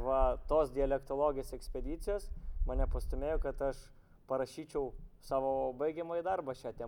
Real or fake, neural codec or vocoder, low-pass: real; none; 19.8 kHz